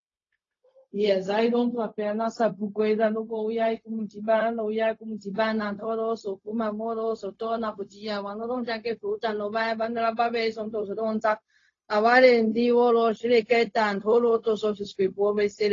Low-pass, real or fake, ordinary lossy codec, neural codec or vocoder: 7.2 kHz; fake; AAC, 32 kbps; codec, 16 kHz, 0.4 kbps, LongCat-Audio-Codec